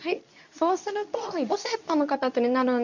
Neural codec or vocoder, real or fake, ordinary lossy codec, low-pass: codec, 24 kHz, 0.9 kbps, WavTokenizer, medium speech release version 2; fake; none; 7.2 kHz